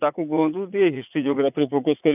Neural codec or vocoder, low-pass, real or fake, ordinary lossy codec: vocoder, 44.1 kHz, 80 mel bands, Vocos; 3.6 kHz; fake; none